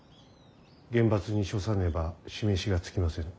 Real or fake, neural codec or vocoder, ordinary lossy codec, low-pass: real; none; none; none